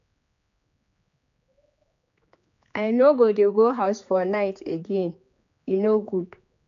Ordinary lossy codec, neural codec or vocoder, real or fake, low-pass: MP3, 64 kbps; codec, 16 kHz, 4 kbps, X-Codec, HuBERT features, trained on general audio; fake; 7.2 kHz